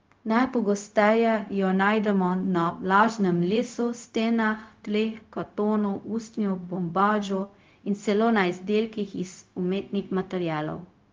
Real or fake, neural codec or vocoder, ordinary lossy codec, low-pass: fake; codec, 16 kHz, 0.4 kbps, LongCat-Audio-Codec; Opus, 32 kbps; 7.2 kHz